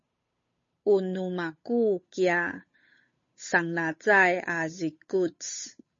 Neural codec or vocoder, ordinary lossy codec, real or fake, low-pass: codec, 16 kHz, 8 kbps, FunCodec, trained on Chinese and English, 25 frames a second; MP3, 32 kbps; fake; 7.2 kHz